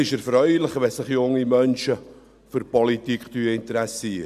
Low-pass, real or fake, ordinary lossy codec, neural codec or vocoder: 14.4 kHz; real; none; none